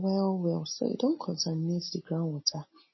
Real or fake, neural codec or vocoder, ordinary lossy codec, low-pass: real; none; MP3, 24 kbps; 7.2 kHz